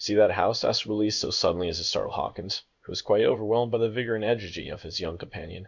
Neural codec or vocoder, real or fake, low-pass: codec, 16 kHz in and 24 kHz out, 1 kbps, XY-Tokenizer; fake; 7.2 kHz